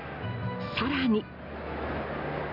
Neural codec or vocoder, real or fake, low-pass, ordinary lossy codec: none; real; 5.4 kHz; none